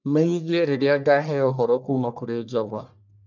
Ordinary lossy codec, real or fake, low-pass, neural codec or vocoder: none; fake; 7.2 kHz; codec, 44.1 kHz, 1.7 kbps, Pupu-Codec